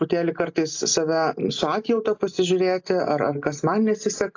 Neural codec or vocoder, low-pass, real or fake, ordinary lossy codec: none; 7.2 kHz; real; AAC, 48 kbps